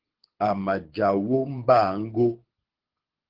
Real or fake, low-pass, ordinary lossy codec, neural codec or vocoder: fake; 5.4 kHz; Opus, 16 kbps; codec, 24 kHz, 6 kbps, HILCodec